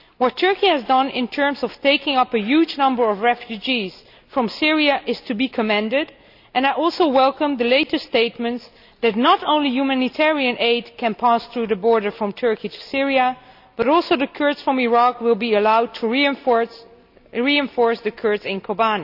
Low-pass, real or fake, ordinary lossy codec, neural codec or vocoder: 5.4 kHz; real; none; none